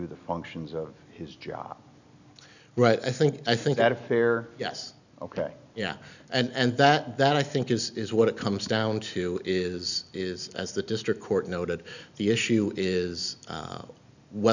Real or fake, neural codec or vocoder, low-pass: real; none; 7.2 kHz